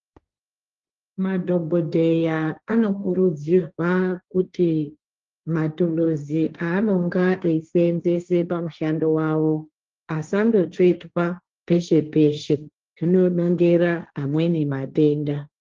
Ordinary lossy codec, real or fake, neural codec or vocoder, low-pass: Opus, 32 kbps; fake; codec, 16 kHz, 1.1 kbps, Voila-Tokenizer; 7.2 kHz